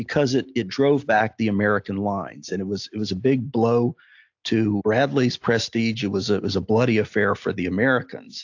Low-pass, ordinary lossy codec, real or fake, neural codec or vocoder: 7.2 kHz; AAC, 48 kbps; real; none